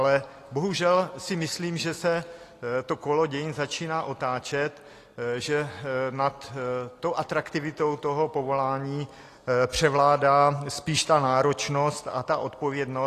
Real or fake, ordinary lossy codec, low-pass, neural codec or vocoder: real; AAC, 48 kbps; 14.4 kHz; none